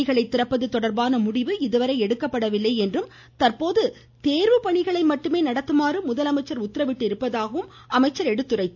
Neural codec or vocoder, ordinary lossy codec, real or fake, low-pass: none; none; real; 7.2 kHz